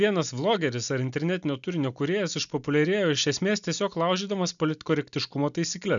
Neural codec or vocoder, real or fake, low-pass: none; real; 7.2 kHz